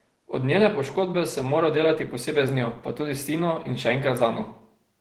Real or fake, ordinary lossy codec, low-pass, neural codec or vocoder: fake; Opus, 16 kbps; 19.8 kHz; vocoder, 48 kHz, 128 mel bands, Vocos